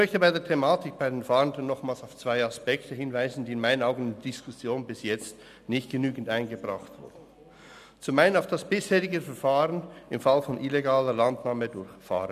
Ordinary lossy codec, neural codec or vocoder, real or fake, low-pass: none; none; real; 14.4 kHz